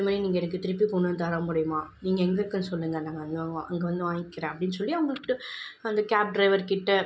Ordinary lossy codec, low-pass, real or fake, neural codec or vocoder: none; none; real; none